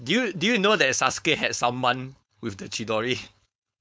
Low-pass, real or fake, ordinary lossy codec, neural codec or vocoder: none; fake; none; codec, 16 kHz, 4.8 kbps, FACodec